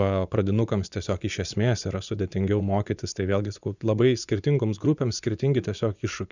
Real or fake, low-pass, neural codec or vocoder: fake; 7.2 kHz; vocoder, 24 kHz, 100 mel bands, Vocos